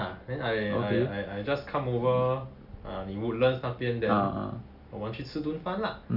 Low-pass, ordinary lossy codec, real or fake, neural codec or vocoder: 5.4 kHz; none; real; none